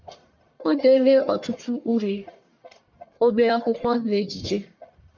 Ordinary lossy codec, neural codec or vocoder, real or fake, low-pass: MP3, 64 kbps; codec, 44.1 kHz, 1.7 kbps, Pupu-Codec; fake; 7.2 kHz